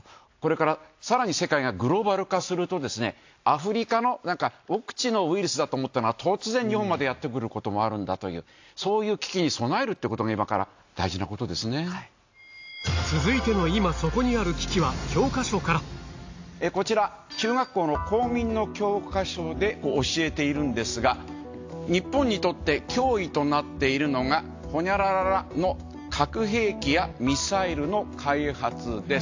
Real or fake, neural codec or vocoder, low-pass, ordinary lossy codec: real; none; 7.2 kHz; AAC, 48 kbps